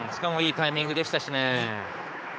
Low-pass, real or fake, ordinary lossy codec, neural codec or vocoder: none; fake; none; codec, 16 kHz, 4 kbps, X-Codec, HuBERT features, trained on general audio